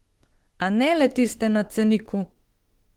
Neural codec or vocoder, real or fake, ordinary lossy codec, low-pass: autoencoder, 48 kHz, 32 numbers a frame, DAC-VAE, trained on Japanese speech; fake; Opus, 16 kbps; 19.8 kHz